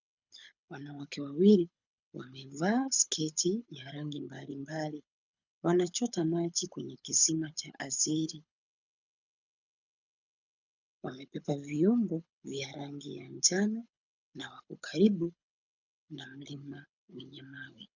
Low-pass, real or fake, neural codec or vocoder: 7.2 kHz; fake; codec, 24 kHz, 6 kbps, HILCodec